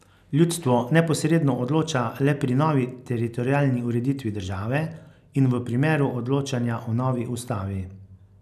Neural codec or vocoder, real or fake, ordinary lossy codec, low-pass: none; real; none; 14.4 kHz